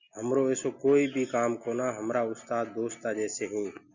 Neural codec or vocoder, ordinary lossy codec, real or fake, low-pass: none; Opus, 64 kbps; real; 7.2 kHz